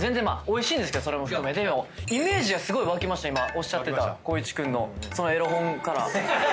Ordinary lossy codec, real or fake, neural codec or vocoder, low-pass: none; real; none; none